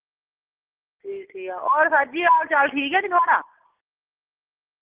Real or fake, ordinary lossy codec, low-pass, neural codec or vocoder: real; Opus, 16 kbps; 3.6 kHz; none